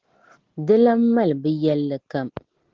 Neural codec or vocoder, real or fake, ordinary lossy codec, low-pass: none; real; Opus, 16 kbps; 7.2 kHz